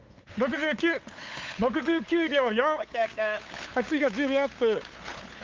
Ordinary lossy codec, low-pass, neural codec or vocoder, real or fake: Opus, 32 kbps; 7.2 kHz; codec, 16 kHz, 8 kbps, FunCodec, trained on LibriTTS, 25 frames a second; fake